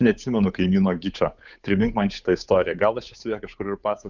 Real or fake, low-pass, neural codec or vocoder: fake; 7.2 kHz; codec, 16 kHz, 8 kbps, FunCodec, trained on Chinese and English, 25 frames a second